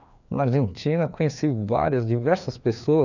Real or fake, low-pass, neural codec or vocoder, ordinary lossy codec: fake; 7.2 kHz; codec, 16 kHz, 2 kbps, FreqCodec, larger model; none